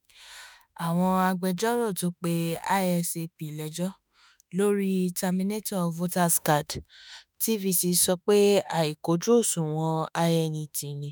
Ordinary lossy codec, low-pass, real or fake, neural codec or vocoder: none; none; fake; autoencoder, 48 kHz, 32 numbers a frame, DAC-VAE, trained on Japanese speech